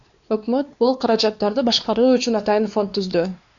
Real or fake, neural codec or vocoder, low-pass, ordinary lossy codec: fake; codec, 16 kHz, 2 kbps, X-Codec, WavLM features, trained on Multilingual LibriSpeech; 7.2 kHz; Opus, 64 kbps